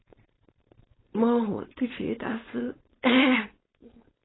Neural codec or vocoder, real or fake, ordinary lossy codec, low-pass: codec, 16 kHz, 4.8 kbps, FACodec; fake; AAC, 16 kbps; 7.2 kHz